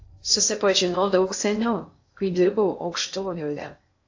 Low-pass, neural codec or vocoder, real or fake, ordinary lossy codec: 7.2 kHz; codec, 16 kHz in and 24 kHz out, 0.6 kbps, FocalCodec, streaming, 2048 codes; fake; AAC, 48 kbps